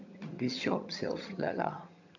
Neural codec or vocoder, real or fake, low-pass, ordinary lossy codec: vocoder, 22.05 kHz, 80 mel bands, HiFi-GAN; fake; 7.2 kHz; MP3, 64 kbps